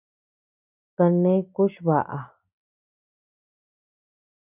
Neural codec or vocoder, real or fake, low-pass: none; real; 3.6 kHz